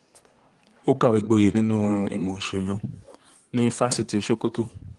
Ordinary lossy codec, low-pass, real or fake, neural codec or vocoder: Opus, 24 kbps; 10.8 kHz; fake; codec, 24 kHz, 1 kbps, SNAC